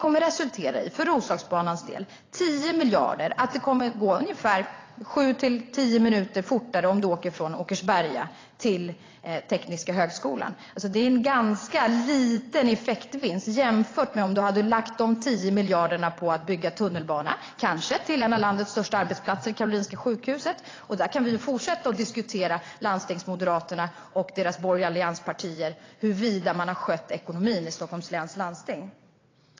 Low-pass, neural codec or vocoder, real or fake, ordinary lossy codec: 7.2 kHz; vocoder, 22.05 kHz, 80 mel bands, WaveNeXt; fake; AAC, 32 kbps